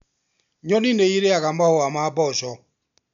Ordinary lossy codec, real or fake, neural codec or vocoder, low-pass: none; real; none; 7.2 kHz